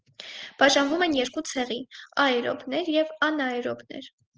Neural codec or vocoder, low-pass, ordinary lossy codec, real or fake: none; 7.2 kHz; Opus, 16 kbps; real